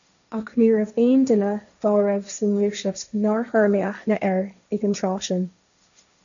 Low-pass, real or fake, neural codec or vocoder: 7.2 kHz; fake; codec, 16 kHz, 1.1 kbps, Voila-Tokenizer